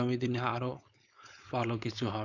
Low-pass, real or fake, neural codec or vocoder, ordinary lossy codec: 7.2 kHz; fake; codec, 16 kHz, 4.8 kbps, FACodec; none